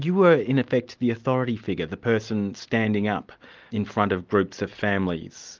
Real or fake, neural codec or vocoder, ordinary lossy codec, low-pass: real; none; Opus, 32 kbps; 7.2 kHz